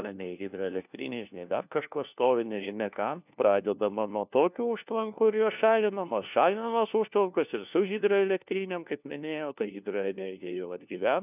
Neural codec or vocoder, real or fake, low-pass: codec, 16 kHz, 1 kbps, FunCodec, trained on LibriTTS, 50 frames a second; fake; 3.6 kHz